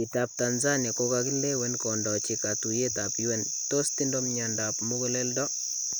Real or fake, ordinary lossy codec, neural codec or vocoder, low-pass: real; none; none; none